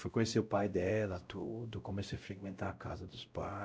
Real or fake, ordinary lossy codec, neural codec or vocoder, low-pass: fake; none; codec, 16 kHz, 0.5 kbps, X-Codec, WavLM features, trained on Multilingual LibriSpeech; none